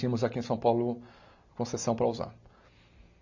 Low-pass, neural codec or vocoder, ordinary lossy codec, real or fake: 7.2 kHz; none; MP3, 48 kbps; real